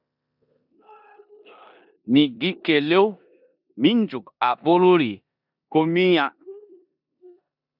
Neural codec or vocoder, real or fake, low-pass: codec, 16 kHz in and 24 kHz out, 0.9 kbps, LongCat-Audio-Codec, four codebook decoder; fake; 5.4 kHz